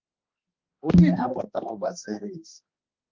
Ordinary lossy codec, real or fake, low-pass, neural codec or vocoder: Opus, 24 kbps; fake; 7.2 kHz; codec, 16 kHz, 1 kbps, X-Codec, HuBERT features, trained on balanced general audio